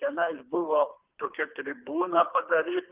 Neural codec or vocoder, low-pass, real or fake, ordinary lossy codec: codec, 24 kHz, 3 kbps, HILCodec; 3.6 kHz; fake; Opus, 24 kbps